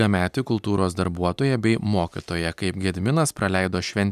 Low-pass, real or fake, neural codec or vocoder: 14.4 kHz; real; none